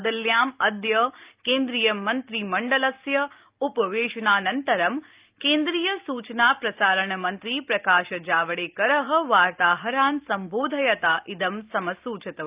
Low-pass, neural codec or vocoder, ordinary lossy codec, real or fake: 3.6 kHz; none; Opus, 24 kbps; real